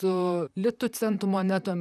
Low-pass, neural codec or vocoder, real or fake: 14.4 kHz; vocoder, 44.1 kHz, 128 mel bands, Pupu-Vocoder; fake